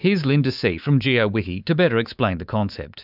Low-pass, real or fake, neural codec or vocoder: 5.4 kHz; fake; codec, 24 kHz, 3.1 kbps, DualCodec